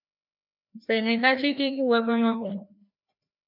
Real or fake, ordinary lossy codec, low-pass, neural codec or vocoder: fake; MP3, 48 kbps; 5.4 kHz; codec, 16 kHz, 1 kbps, FreqCodec, larger model